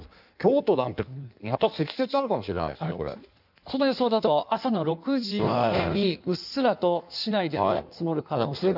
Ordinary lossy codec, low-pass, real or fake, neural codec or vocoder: none; 5.4 kHz; fake; codec, 16 kHz in and 24 kHz out, 1.1 kbps, FireRedTTS-2 codec